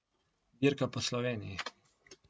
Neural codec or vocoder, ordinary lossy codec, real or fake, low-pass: none; none; real; none